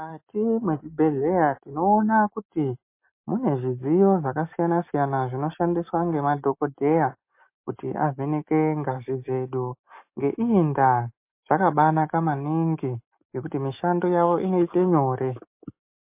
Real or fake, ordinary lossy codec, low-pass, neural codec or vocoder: real; MP3, 24 kbps; 3.6 kHz; none